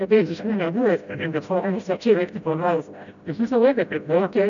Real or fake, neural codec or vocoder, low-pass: fake; codec, 16 kHz, 0.5 kbps, FreqCodec, smaller model; 7.2 kHz